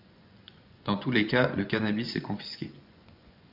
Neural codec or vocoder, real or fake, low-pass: vocoder, 24 kHz, 100 mel bands, Vocos; fake; 5.4 kHz